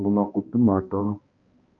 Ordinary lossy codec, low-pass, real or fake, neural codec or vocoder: Opus, 24 kbps; 7.2 kHz; fake; codec, 16 kHz, 1 kbps, X-Codec, HuBERT features, trained on balanced general audio